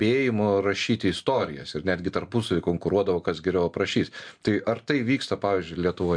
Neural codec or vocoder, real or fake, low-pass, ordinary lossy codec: none; real; 9.9 kHz; MP3, 64 kbps